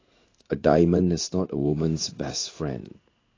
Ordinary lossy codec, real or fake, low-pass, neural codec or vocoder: AAC, 32 kbps; fake; 7.2 kHz; codec, 16 kHz in and 24 kHz out, 1 kbps, XY-Tokenizer